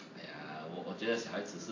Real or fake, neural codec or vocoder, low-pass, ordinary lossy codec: real; none; 7.2 kHz; AAC, 32 kbps